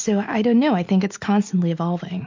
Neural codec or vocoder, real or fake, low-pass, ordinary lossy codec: none; real; 7.2 kHz; MP3, 48 kbps